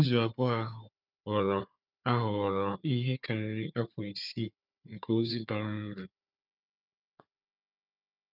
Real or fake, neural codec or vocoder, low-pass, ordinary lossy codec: fake; codec, 16 kHz, 4 kbps, FunCodec, trained on Chinese and English, 50 frames a second; 5.4 kHz; none